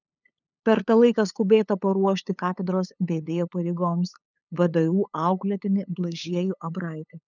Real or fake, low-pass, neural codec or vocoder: fake; 7.2 kHz; codec, 16 kHz, 8 kbps, FunCodec, trained on LibriTTS, 25 frames a second